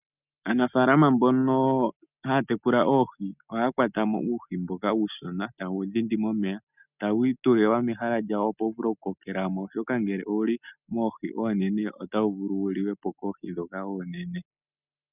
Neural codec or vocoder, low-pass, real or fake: none; 3.6 kHz; real